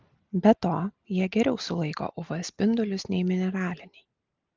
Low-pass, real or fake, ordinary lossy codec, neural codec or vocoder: 7.2 kHz; real; Opus, 24 kbps; none